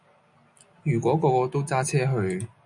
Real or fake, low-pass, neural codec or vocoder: real; 10.8 kHz; none